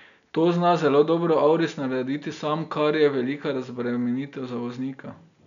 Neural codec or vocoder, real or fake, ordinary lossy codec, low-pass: none; real; none; 7.2 kHz